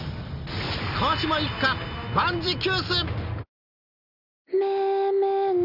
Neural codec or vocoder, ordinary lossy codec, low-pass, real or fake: none; none; 5.4 kHz; real